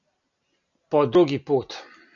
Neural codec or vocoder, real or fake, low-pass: none; real; 7.2 kHz